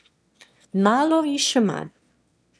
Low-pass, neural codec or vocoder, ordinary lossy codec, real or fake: none; autoencoder, 22.05 kHz, a latent of 192 numbers a frame, VITS, trained on one speaker; none; fake